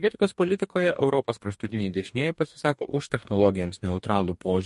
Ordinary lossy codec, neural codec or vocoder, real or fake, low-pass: MP3, 48 kbps; codec, 44.1 kHz, 2.6 kbps, DAC; fake; 14.4 kHz